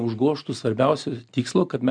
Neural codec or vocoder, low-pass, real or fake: none; 9.9 kHz; real